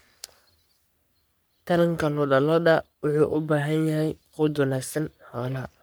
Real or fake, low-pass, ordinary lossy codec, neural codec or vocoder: fake; none; none; codec, 44.1 kHz, 3.4 kbps, Pupu-Codec